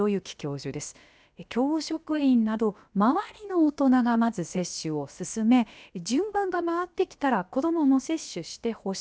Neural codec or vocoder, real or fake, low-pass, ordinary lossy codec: codec, 16 kHz, about 1 kbps, DyCAST, with the encoder's durations; fake; none; none